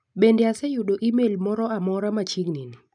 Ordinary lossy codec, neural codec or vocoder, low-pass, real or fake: none; none; 9.9 kHz; real